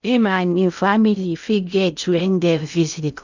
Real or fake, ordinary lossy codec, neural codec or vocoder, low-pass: fake; none; codec, 16 kHz in and 24 kHz out, 0.6 kbps, FocalCodec, streaming, 2048 codes; 7.2 kHz